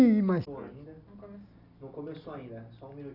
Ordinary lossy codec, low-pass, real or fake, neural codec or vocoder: none; 5.4 kHz; real; none